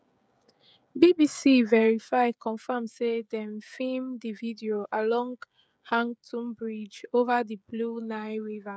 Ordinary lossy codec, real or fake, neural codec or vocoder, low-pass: none; fake; codec, 16 kHz, 16 kbps, FreqCodec, smaller model; none